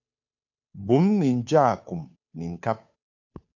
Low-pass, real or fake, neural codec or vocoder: 7.2 kHz; fake; codec, 16 kHz, 2 kbps, FunCodec, trained on Chinese and English, 25 frames a second